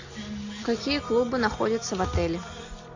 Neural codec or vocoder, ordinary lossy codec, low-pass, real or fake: none; AAC, 48 kbps; 7.2 kHz; real